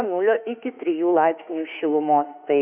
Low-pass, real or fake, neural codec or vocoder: 3.6 kHz; fake; autoencoder, 48 kHz, 32 numbers a frame, DAC-VAE, trained on Japanese speech